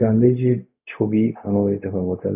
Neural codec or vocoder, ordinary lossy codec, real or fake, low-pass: codec, 16 kHz, 0.4 kbps, LongCat-Audio-Codec; none; fake; 3.6 kHz